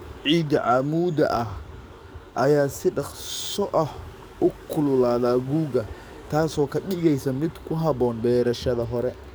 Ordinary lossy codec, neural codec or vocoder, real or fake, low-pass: none; codec, 44.1 kHz, 7.8 kbps, DAC; fake; none